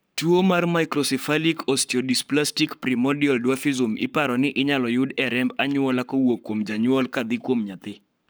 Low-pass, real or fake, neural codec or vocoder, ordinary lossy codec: none; fake; codec, 44.1 kHz, 7.8 kbps, Pupu-Codec; none